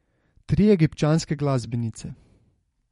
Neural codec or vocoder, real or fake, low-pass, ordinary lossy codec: vocoder, 44.1 kHz, 128 mel bands every 256 samples, BigVGAN v2; fake; 19.8 kHz; MP3, 48 kbps